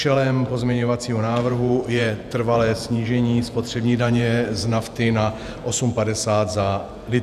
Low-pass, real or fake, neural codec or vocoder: 14.4 kHz; fake; vocoder, 48 kHz, 128 mel bands, Vocos